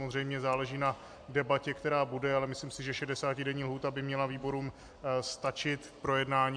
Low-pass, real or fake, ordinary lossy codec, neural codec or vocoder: 9.9 kHz; real; AAC, 64 kbps; none